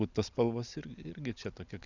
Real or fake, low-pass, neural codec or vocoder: real; 7.2 kHz; none